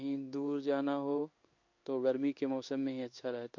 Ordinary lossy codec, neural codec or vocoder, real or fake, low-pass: MP3, 48 kbps; codec, 16 kHz in and 24 kHz out, 1 kbps, XY-Tokenizer; fake; 7.2 kHz